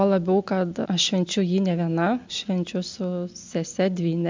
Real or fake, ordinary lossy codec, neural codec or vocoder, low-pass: real; MP3, 64 kbps; none; 7.2 kHz